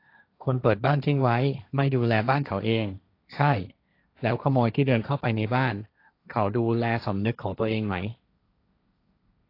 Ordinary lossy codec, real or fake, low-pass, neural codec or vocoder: AAC, 32 kbps; fake; 5.4 kHz; codec, 16 kHz, 1.1 kbps, Voila-Tokenizer